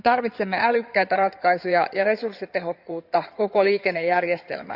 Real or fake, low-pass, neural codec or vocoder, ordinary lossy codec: fake; 5.4 kHz; codec, 16 kHz in and 24 kHz out, 2.2 kbps, FireRedTTS-2 codec; none